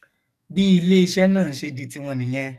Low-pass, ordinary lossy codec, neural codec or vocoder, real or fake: 14.4 kHz; none; codec, 44.1 kHz, 2.6 kbps, SNAC; fake